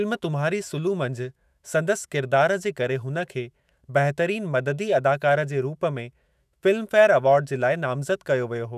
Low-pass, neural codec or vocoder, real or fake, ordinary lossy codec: 14.4 kHz; autoencoder, 48 kHz, 128 numbers a frame, DAC-VAE, trained on Japanese speech; fake; none